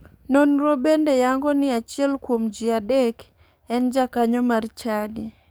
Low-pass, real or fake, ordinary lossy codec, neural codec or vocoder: none; fake; none; codec, 44.1 kHz, 7.8 kbps, DAC